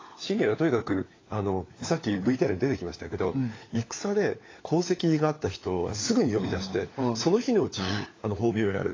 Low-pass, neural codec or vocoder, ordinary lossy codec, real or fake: 7.2 kHz; codec, 16 kHz, 4 kbps, FunCodec, trained on LibriTTS, 50 frames a second; AAC, 32 kbps; fake